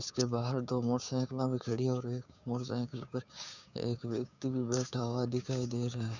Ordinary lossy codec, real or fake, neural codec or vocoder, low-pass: none; fake; codec, 16 kHz, 6 kbps, DAC; 7.2 kHz